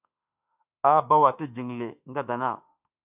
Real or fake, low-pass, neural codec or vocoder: fake; 3.6 kHz; autoencoder, 48 kHz, 32 numbers a frame, DAC-VAE, trained on Japanese speech